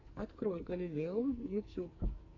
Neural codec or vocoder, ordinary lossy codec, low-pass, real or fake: codec, 44.1 kHz, 2.6 kbps, SNAC; MP3, 48 kbps; 7.2 kHz; fake